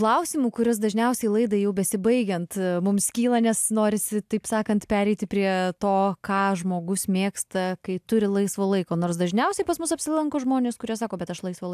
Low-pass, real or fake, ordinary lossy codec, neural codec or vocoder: 14.4 kHz; real; AAC, 96 kbps; none